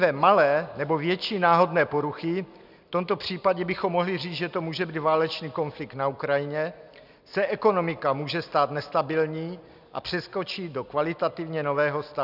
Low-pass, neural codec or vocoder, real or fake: 5.4 kHz; none; real